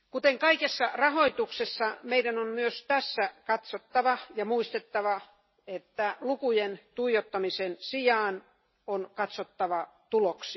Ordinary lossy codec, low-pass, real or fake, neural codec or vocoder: MP3, 24 kbps; 7.2 kHz; real; none